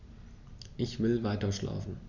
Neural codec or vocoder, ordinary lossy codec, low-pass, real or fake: none; none; 7.2 kHz; real